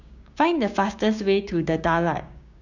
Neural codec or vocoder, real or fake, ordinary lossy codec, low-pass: codec, 16 kHz, 6 kbps, DAC; fake; none; 7.2 kHz